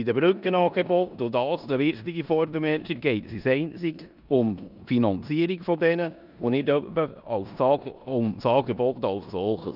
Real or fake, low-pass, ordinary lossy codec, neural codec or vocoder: fake; 5.4 kHz; none; codec, 16 kHz in and 24 kHz out, 0.9 kbps, LongCat-Audio-Codec, four codebook decoder